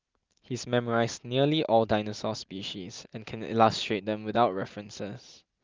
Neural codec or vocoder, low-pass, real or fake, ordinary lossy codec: none; 7.2 kHz; real; Opus, 24 kbps